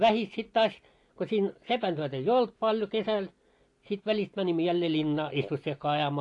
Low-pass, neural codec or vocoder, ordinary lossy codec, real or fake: 10.8 kHz; none; AAC, 48 kbps; real